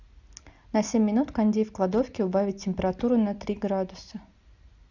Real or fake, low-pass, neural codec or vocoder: real; 7.2 kHz; none